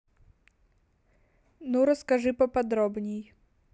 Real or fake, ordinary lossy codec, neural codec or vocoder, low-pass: real; none; none; none